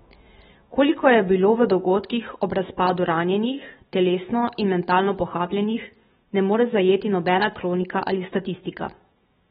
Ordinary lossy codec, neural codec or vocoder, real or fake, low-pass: AAC, 16 kbps; autoencoder, 48 kHz, 128 numbers a frame, DAC-VAE, trained on Japanese speech; fake; 19.8 kHz